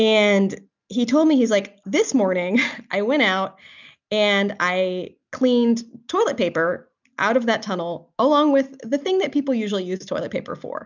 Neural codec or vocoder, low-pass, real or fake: none; 7.2 kHz; real